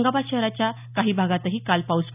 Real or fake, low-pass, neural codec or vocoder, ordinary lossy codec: real; 3.6 kHz; none; none